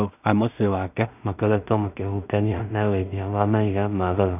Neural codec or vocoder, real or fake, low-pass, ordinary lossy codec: codec, 16 kHz in and 24 kHz out, 0.4 kbps, LongCat-Audio-Codec, two codebook decoder; fake; 3.6 kHz; none